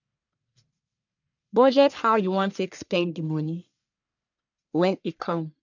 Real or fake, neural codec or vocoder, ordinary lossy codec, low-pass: fake; codec, 44.1 kHz, 1.7 kbps, Pupu-Codec; none; 7.2 kHz